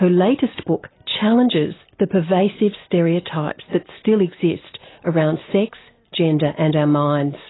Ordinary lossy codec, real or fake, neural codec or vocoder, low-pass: AAC, 16 kbps; real; none; 7.2 kHz